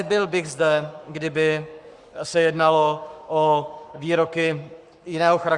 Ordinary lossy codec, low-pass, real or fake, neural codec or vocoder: Opus, 64 kbps; 10.8 kHz; fake; codec, 44.1 kHz, 7.8 kbps, Pupu-Codec